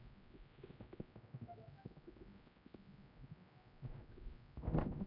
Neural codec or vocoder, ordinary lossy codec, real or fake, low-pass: codec, 16 kHz, 0.5 kbps, X-Codec, HuBERT features, trained on general audio; none; fake; 5.4 kHz